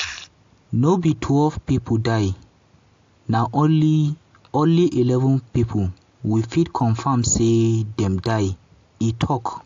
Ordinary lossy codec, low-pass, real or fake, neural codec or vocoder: AAC, 48 kbps; 7.2 kHz; real; none